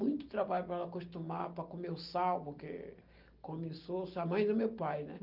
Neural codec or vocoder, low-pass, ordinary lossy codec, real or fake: none; 5.4 kHz; Opus, 32 kbps; real